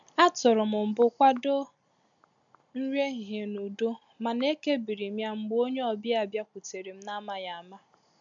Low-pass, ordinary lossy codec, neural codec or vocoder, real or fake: 7.2 kHz; none; none; real